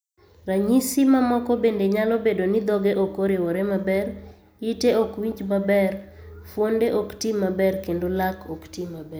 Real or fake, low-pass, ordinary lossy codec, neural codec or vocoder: real; none; none; none